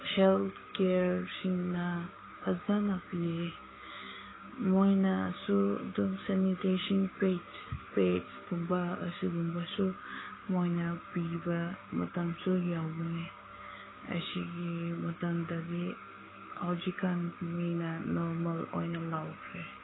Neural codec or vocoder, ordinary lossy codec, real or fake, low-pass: codec, 16 kHz, 6 kbps, DAC; AAC, 16 kbps; fake; 7.2 kHz